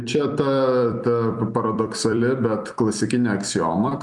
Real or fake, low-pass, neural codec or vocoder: fake; 10.8 kHz; vocoder, 44.1 kHz, 128 mel bands every 256 samples, BigVGAN v2